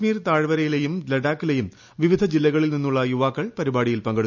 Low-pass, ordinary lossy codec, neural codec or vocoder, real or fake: 7.2 kHz; none; none; real